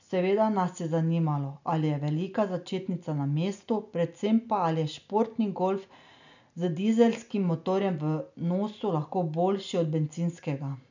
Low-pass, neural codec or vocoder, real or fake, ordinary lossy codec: 7.2 kHz; none; real; none